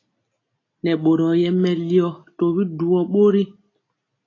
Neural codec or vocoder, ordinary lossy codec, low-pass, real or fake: none; AAC, 32 kbps; 7.2 kHz; real